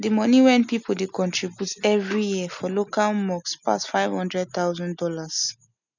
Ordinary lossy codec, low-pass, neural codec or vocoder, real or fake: none; 7.2 kHz; none; real